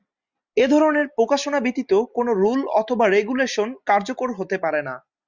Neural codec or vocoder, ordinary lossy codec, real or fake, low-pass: none; Opus, 64 kbps; real; 7.2 kHz